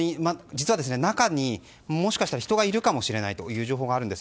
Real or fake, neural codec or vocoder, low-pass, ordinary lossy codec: real; none; none; none